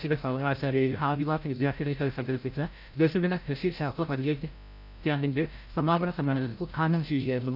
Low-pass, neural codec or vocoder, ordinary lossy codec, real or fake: 5.4 kHz; codec, 16 kHz, 0.5 kbps, FreqCodec, larger model; AAC, 48 kbps; fake